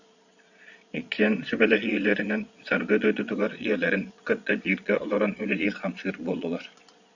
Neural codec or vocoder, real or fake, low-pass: vocoder, 22.05 kHz, 80 mel bands, Vocos; fake; 7.2 kHz